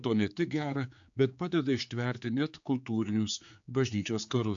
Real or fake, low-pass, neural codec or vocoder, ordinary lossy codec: fake; 7.2 kHz; codec, 16 kHz, 4 kbps, X-Codec, HuBERT features, trained on general audio; AAC, 64 kbps